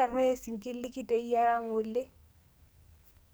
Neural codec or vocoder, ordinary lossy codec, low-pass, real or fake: codec, 44.1 kHz, 2.6 kbps, SNAC; none; none; fake